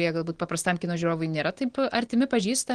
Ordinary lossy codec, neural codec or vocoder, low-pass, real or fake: Opus, 16 kbps; none; 9.9 kHz; real